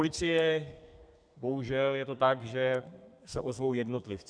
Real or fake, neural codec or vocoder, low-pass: fake; codec, 32 kHz, 1.9 kbps, SNAC; 9.9 kHz